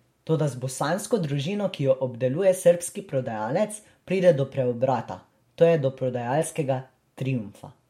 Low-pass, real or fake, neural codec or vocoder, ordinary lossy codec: 19.8 kHz; real; none; MP3, 64 kbps